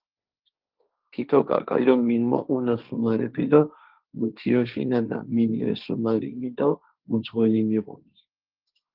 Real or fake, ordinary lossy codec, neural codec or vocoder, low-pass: fake; Opus, 32 kbps; codec, 16 kHz, 1.1 kbps, Voila-Tokenizer; 5.4 kHz